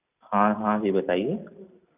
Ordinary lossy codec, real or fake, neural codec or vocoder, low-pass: none; real; none; 3.6 kHz